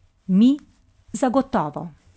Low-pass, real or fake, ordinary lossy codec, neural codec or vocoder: none; real; none; none